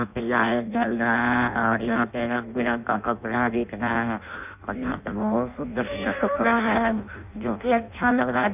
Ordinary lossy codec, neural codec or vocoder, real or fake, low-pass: none; codec, 16 kHz in and 24 kHz out, 0.6 kbps, FireRedTTS-2 codec; fake; 3.6 kHz